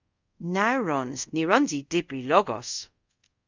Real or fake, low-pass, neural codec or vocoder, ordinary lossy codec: fake; 7.2 kHz; codec, 24 kHz, 0.5 kbps, DualCodec; Opus, 64 kbps